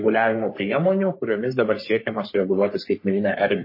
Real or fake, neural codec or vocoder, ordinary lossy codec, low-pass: fake; codec, 44.1 kHz, 3.4 kbps, Pupu-Codec; MP3, 24 kbps; 5.4 kHz